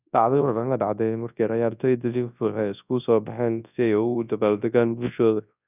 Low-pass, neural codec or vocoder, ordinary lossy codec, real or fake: 3.6 kHz; codec, 24 kHz, 0.9 kbps, WavTokenizer, large speech release; none; fake